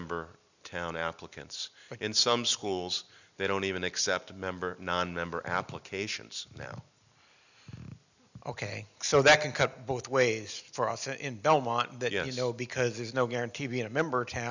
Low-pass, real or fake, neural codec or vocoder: 7.2 kHz; real; none